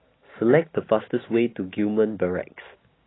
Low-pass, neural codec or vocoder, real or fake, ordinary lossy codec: 7.2 kHz; none; real; AAC, 16 kbps